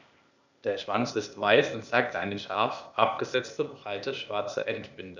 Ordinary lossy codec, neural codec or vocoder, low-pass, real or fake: none; codec, 16 kHz, 0.8 kbps, ZipCodec; 7.2 kHz; fake